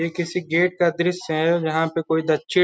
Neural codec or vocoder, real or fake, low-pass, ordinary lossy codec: none; real; none; none